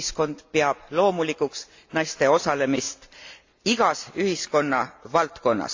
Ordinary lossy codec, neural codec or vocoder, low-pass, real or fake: AAC, 48 kbps; none; 7.2 kHz; real